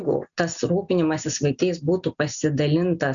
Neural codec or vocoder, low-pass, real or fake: none; 7.2 kHz; real